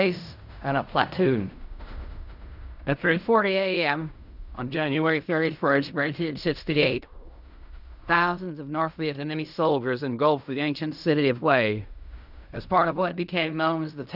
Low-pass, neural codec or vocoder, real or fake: 5.4 kHz; codec, 16 kHz in and 24 kHz out, 0.4 kbps, LongCat-Audio-Codec, fine tuned four codebook decoder; fake